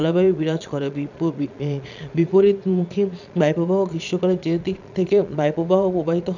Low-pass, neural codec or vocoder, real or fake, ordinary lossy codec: 7.2 kHz; vocoder, 22.05 kHz, 80 mel bands, Vocos; fake; none